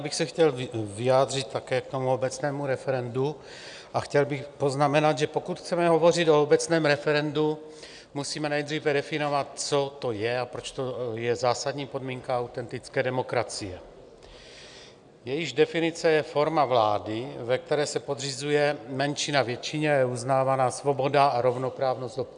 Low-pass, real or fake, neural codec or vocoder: 9.9 kHz; real; none